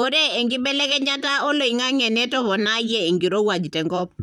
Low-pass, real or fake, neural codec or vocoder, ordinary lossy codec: 19.8 kHz; fake; vocoder, 44.1 kHz, 128 mel bands, Pupu-Vocoder; none